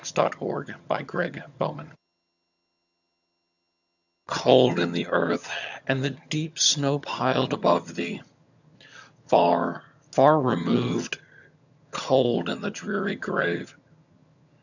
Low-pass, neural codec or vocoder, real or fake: 7.2 kHz; vocoder, 22.05 kHz, 80 mel bands, HiFi-GAN; fake